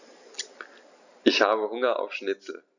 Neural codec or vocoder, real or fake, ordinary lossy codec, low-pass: none; real; none; 7.2 kHz